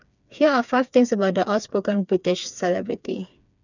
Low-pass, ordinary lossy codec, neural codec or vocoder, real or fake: 7.2 kHz; none; codec, 16 kHz, 4 kbps, FreqCodec, smaller model; fake